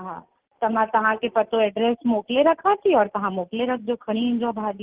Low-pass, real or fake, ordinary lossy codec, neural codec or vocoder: 3.6 kHz; real; Opus, 16 kbps; none